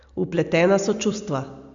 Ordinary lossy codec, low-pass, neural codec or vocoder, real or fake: Opus, 64 kbps; 7.2 kHz; none; real